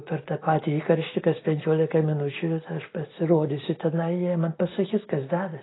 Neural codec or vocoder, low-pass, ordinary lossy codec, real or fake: none; 7.2 kHz; AAC, 16 kbps; real